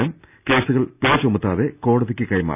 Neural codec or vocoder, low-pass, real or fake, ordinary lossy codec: none; 3.6 kHz; real; none